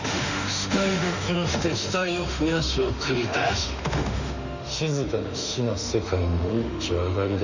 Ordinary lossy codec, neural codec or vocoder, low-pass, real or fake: none; autoencoder, 48 kHz, 32 numbers a frame, DAC-VAE, trained on Japanese speech; 7.2 kHz; fake